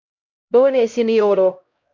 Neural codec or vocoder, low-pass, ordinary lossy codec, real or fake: codec, 16 kHz, 0.5 kbps, X-Codec, HuBERT features, trained on LibriSpeech; 7.2 kHz; MP3, 48 kbps; fake